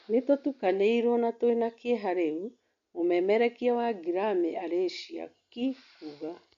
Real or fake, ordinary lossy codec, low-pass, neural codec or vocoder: real; MP3, 48 kbps; 7.2 kHz; none